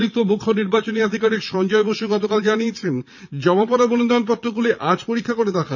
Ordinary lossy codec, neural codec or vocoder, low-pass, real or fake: none; vocoder, 22.05 kHz, 80 mel bands, Vocos; 7.2 kHz; fake